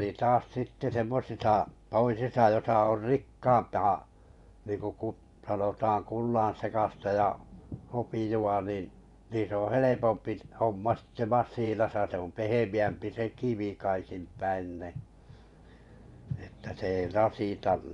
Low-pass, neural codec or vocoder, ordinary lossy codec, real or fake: 10.8 kHz; vocoder, 24 kHz, 100 mel bands, Vocos; none; fake